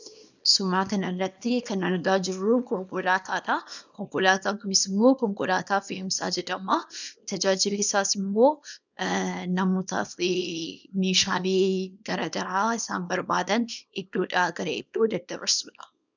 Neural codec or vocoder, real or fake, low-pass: codec, 24 kHz, 0.9 kbps, WavTokenizer, small release; fake; 7.2 kHz